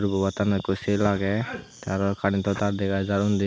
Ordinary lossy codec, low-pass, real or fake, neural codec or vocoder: none; none; real; none